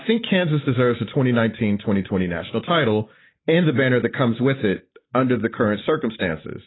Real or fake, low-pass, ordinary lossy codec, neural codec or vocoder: real; 7.2 kHz; AAC, 16 kbps; none